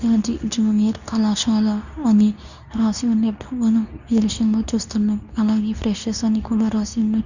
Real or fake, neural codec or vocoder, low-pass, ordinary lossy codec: fake; codec, 24 kHz, 0.9 kbps, WavTokenizer, medium speech release version 2; 7.2 kHz; none